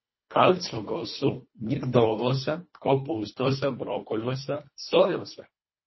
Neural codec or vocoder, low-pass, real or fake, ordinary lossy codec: codec, 24 kHz, 1.5 kbps, HILCodec; 7.2 kHz; fake; MP3, 24 kbps